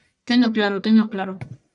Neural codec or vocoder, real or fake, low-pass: codec, 44.1 kHz, 1.7 kbps, Pupu-Codec; fake; 10.8 kHz